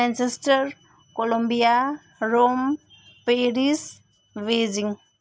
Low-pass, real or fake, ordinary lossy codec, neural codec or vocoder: none; real; none; none